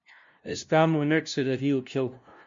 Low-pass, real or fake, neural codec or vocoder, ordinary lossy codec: 7.2 kHz; fake; codec, 16 kHz, 0.5 kbps, FunCodec, trained on LibriTTS, 25 frames a second; MP3, 48 kbps